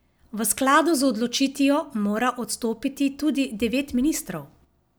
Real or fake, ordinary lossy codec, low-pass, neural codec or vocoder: real; none; none; none